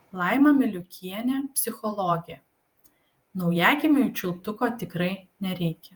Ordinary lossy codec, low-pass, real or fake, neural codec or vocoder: Opus, 32 kbps; 19.8 kHz; fake; vocoder, 48 kHz, 128 mel bands, Vocos